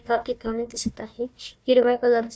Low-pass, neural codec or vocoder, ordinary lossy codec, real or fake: none; codec, 16 kHz, 1 kbps, FunCodec, trained on Chinese and English, 50 frames a second; none; fake